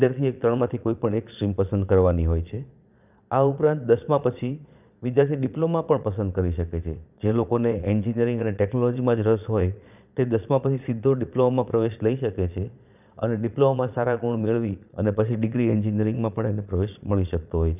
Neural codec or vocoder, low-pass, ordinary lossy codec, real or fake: vocoder, 22.05 kHz, 80 mel bands, Vocos; 3.6 kHz; none; fake